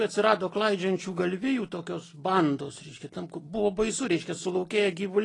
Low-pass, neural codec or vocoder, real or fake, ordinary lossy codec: 10.8 kHz; none; real; AAC, 32 kbps